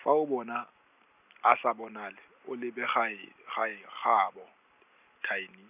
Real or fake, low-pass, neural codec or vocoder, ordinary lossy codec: real; 3.6 kHz; none; none